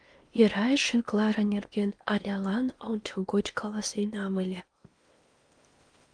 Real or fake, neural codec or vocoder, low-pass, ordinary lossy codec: fake; codec, 16 kHz in and 24 kHz out, 0.8 kbps, FocalCodec, streaming, 65536 codes; 9.9 kHz; Opus, 32 kbps